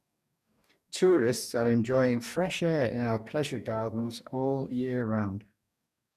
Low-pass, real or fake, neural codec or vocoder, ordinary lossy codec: 14.4 kHz; fake; codec, 44.1 kHz, 2.6 kbps, DAC; none